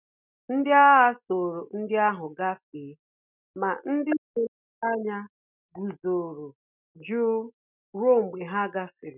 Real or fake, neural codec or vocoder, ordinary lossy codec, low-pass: real; none; none; 3.6 kHz